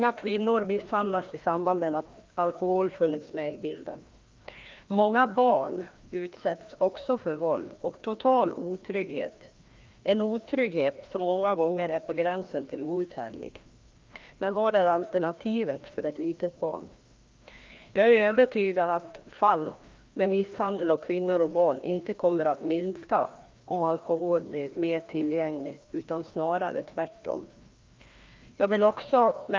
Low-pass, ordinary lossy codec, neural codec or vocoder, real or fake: 7.2 kHz; Opus, 32 kbps; codec, 16 kHz, 1 kbps, FreqCodec, larger model; fake